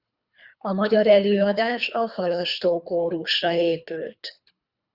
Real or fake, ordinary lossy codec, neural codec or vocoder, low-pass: fake; Opus, 64 kbps; codec, 24 kHz, 3 kbps, HILCodec; 5.4 kHz